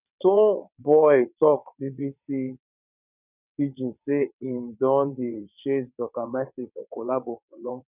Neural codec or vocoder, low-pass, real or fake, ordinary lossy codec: vocoder, 22.05 kHz, 80 mel bands, WaveNeXt; 3.6 kHz; fake; none